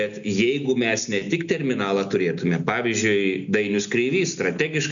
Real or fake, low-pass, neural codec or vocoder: real; 7.2 kHz; none